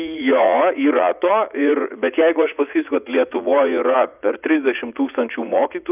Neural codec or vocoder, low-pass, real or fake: vocoder, 44.1 kHz, 80 mel bands, Vocos; 3.6 kHz; fake